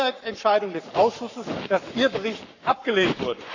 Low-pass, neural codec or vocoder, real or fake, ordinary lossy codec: 7.2 kHz; codec, 44.1 kHz, 7.8 kbps, Pupu-Codec; fake; none